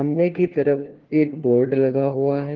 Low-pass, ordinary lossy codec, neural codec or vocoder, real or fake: 7.2 kHz; Opus, 16 kbps; codec, 16 kHz, 1 kbps, FunCodec, trained on LibriTTS, 50 frames a second; fake